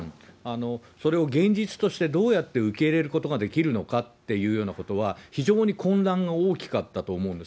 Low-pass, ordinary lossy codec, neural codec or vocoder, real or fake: none; none; none; real